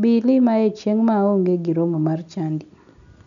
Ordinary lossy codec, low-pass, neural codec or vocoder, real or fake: none; 7.2 kHz; none; real